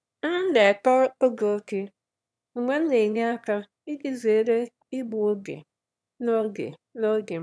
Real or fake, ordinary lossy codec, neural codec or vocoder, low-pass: fake; none; autoencoder, 22.05 kHz, a latent of 192 numbers a frame, VITS, trained on one speaker; none